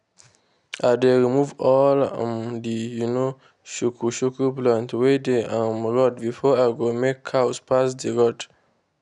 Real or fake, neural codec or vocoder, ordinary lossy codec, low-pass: real; none; none; 10.8 kHz